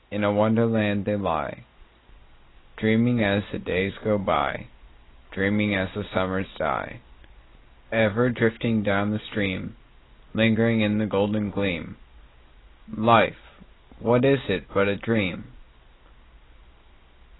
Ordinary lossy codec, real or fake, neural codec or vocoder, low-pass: AAC, 16 kbps; real; none; 7.2 kHz